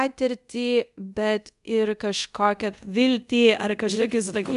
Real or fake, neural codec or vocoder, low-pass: fake; codec, 24 kHz, 0.5 kbps, DualCodec; 10.8 kHz